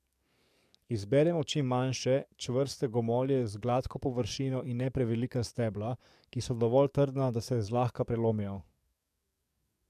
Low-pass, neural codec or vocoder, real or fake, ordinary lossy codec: 14.4 kHz; codec, 44.1 kHz, 7.8 kbps, DAC; fake; MP3, 96 kbps